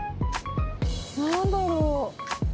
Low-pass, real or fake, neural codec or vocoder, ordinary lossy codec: none; real; none; none